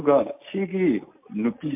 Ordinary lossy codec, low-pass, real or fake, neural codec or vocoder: MP3, 32 kbps; 3.6 kHz; real; none